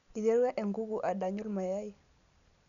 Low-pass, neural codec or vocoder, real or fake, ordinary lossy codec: 7.2 kHz; none; real; none